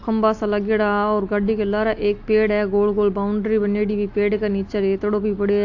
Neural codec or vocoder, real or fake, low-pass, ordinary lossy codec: none; real; 7.2 kHz; AAC, 48 kbps